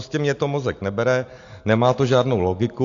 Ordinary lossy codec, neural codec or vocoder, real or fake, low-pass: AAC, 64 kbps; none; real; 7.2 kHz